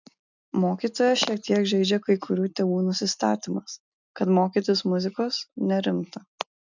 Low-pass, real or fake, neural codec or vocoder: 7.2 kHz; real; none